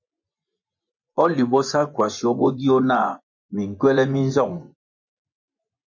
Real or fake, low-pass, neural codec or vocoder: fake; 7.2 kHz; vocoder, 24 kHz, 100 mel bands, Vocos